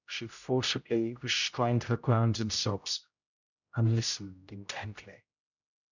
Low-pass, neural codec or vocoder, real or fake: 7.2 kHz; codec, 16 kHz, 0.5 kbps, X-Codec, HuBERT features, trained on general audio; fake